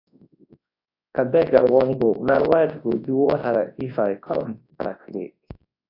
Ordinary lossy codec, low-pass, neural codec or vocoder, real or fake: AAC, 32 kbps; 5.4 kHz; codec, 24 kHz, 0.9 kbps, WavTokenizer, large speech release; fake